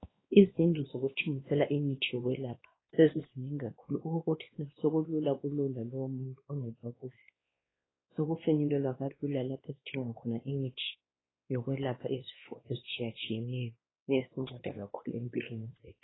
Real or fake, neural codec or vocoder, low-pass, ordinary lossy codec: fake; codec, 16 kHz, 2 kbps, X-Codec, WavLM features, trained on Multilingual LibriSpeech; 7.2 kHz; AAC, 16 kbps